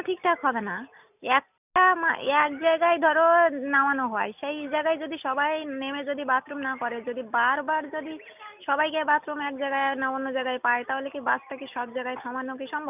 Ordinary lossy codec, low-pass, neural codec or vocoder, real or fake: none; 3.6 kHz; none; real